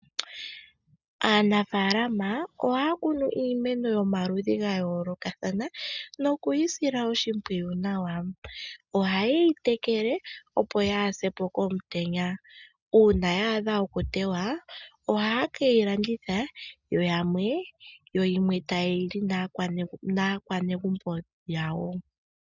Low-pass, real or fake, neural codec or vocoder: 7.2 kHz; real; none